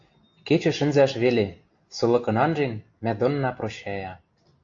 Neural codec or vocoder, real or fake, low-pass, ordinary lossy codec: none; real; 7.2 kHz; AAC, 48 kbps